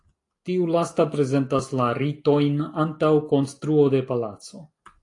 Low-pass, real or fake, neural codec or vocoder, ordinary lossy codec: 10.8 kHz; real; none; AAC, 48 kbps